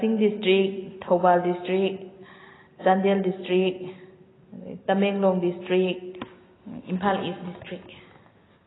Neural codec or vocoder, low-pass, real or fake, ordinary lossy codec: none; 7.2 kHz; real; AAC, 16 kbps